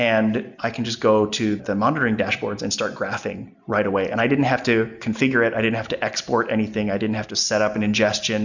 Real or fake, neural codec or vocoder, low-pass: real; none; 7.2 kHz